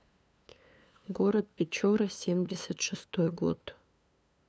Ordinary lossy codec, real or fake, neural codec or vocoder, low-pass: none; fake; codec, 16 kHz, 2 kbps, FunCodec, trained on LibriTTS, 25 frames a second; none